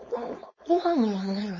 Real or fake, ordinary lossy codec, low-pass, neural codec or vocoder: fake; MP3, 32 kbps; 7.2 kHz; codec, 16 kHz, 4.8 kbps, FACodec